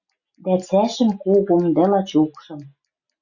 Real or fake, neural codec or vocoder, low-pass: real; none; 7.2 kHz